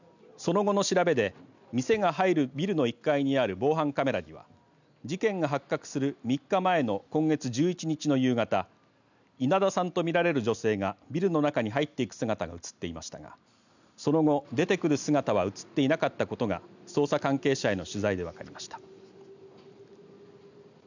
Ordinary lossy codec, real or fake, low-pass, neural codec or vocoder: none; real; 7.2 kHz; none